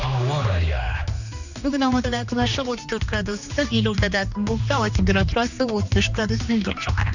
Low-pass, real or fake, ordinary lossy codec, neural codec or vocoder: 7.2 kHz; fake; none; codec, 16 kHz, 2 kbps, X-Codec, HuBERT features, trained on general audio